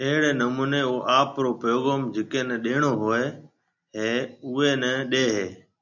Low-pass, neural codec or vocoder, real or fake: 7.2 kHz; none; real